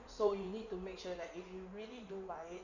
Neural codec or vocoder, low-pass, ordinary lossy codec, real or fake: codec, 16 kHz in and 24 kHz out, 2.2 kbps, FireRedTTS-2 codec; 7.2 kHz; none; fake